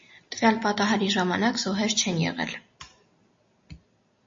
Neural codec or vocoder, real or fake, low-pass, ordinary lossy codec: none; real; 7.2 kHz; MP3, 32 kbps